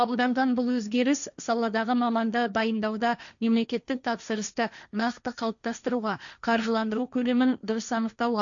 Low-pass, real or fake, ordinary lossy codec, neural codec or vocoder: 7.2 kHz; fake; none; codec, 16 kHz, 1.1 kbps, Voila-Tokenizer